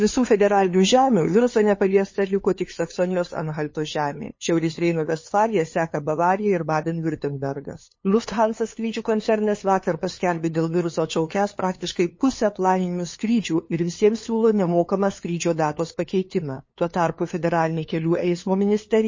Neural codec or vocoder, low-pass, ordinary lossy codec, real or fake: codec, 16 kHz, 2 kbps, FunCodec, trained on LibriTTS, 25 frames a second; 7.2 kHz; MP3, 32 kbps; fake